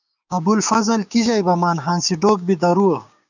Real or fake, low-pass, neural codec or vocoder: fake; 7.2 kHz; codec, 16 kHz, 6 kbps, DAC